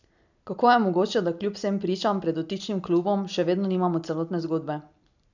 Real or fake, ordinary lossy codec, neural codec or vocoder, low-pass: real; none; none; 7.2 kHz